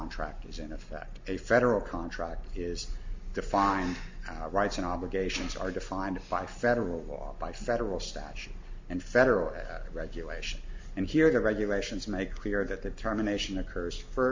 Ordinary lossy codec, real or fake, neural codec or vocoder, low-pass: MP3, 48 kbps; real; none; 7.2 kHz